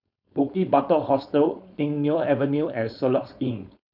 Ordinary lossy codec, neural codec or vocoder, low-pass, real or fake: none; codec, 16 kHz, 4.8 kbps, FACodec; 5.4 kHz; fake